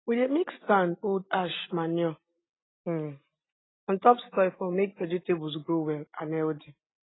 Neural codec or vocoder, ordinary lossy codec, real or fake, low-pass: none; AAC, 16 kbps; real; 7.2 kHz